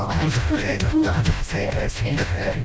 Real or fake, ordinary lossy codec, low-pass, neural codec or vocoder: fake; none; none; codec, 16 kHz, 0.5 kbps, FreqCodec, smaller model